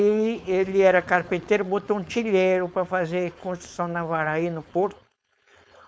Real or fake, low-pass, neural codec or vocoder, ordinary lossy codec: fake; none; codec, 16 kHz, 4.8 kbps, FACodec; none